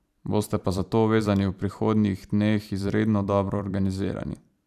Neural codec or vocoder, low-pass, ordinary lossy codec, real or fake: vocoder, 44.1 kHz, 128 mel bands every 512 samples, BigVGAN v2; 14.4 kHz; none; fake